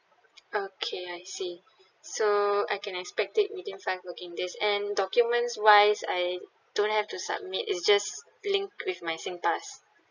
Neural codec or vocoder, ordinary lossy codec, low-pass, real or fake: none; none; 7.2 kHz; real